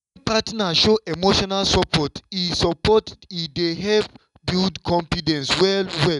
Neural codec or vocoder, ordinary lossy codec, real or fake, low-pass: none; none; real; 10.8 kHz